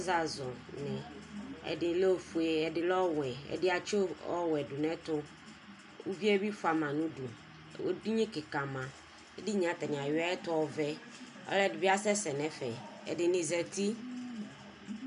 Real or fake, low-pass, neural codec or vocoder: real; 10.8 kHz; none